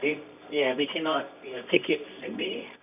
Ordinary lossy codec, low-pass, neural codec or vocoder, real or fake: none; 3.6 kHz; codec, 24 kHz, 0.9 kbps, WavTokenizer, medium music audio release; fake